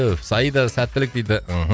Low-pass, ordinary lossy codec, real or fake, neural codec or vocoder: none; none; real; none